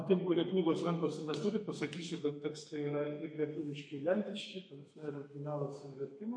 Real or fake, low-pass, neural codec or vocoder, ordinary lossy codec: fake; 9.9 kHz; codec, 32 kHz, 1.9 kbps, SNAC; MP3, 48 kbps